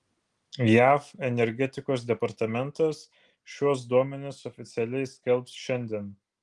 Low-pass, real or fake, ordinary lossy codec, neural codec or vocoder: 10.8 kHz; real; Opus, 24 kbps; none